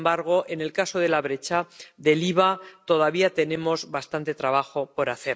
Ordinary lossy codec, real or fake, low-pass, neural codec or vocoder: none; real; none; none